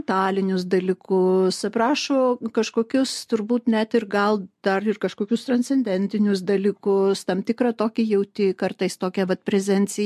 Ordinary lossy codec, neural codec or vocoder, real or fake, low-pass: MP3, 64 kbps; none; real; 14.4 kHz